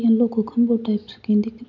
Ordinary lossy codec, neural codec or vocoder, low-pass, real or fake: none; none; 7.2 kHz; real